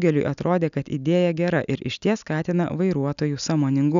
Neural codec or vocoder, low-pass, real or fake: none; 7.2 kHz; real